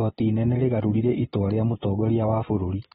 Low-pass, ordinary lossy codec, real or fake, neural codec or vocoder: 19.8 kHz; AAC, 16 kbps; real; none